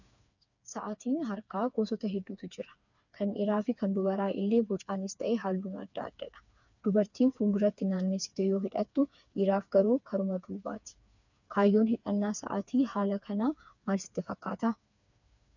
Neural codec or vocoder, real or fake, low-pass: codec, 16 kHz, 4 kbps, FreqCodec, smaller model; fake; 7.2 kHz